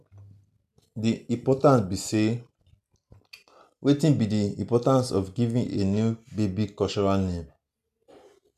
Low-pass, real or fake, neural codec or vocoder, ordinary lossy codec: 14.4 kHz; real; none; none